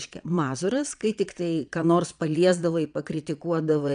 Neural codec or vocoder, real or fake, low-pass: vocoder, 22.05 kHz, 80 mel bands, Vocos; fake; 9.9 kHz